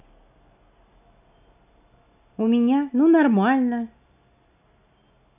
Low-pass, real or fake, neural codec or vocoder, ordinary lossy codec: 3.6 kHz; real; none; none